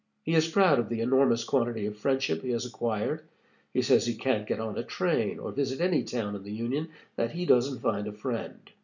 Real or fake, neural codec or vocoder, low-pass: real; none; 7.2 kHz